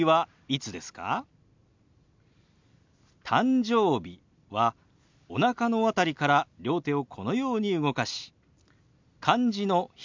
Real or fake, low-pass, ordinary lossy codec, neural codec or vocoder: real; 7.2 kHz; MP3, 64 kbps; none